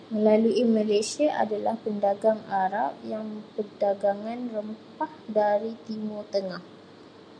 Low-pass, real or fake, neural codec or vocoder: 9.9 kHz; real; none